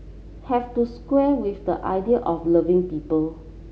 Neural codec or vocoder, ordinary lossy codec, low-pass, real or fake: none; none; none; real